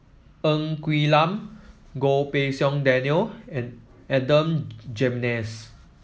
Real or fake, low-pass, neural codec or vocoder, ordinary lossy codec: real; none; none; none